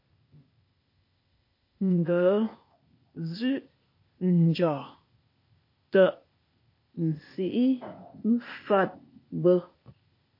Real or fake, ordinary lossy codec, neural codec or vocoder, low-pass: fake; MP3, 32 kbps; codec, 16 kHz, 0.8 kbps, ZipCodec; 5.4 kHz